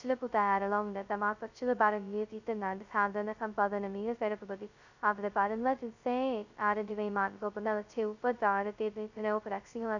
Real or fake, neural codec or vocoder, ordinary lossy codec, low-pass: fake; codec, 16 kHz, 0.2 kbps, FocalCodec; none; 7.2 kHz